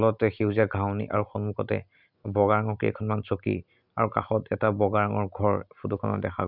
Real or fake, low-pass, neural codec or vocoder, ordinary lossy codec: real; 5.4 kHz; none; none